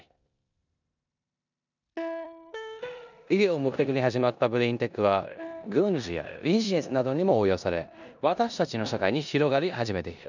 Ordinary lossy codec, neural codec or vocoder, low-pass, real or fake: none; codec, 16 kHz in and 24 kHz out, 0.9 kbps, LongCat-Audio-Codec, four codebook decoder; 7.2 kHz; fake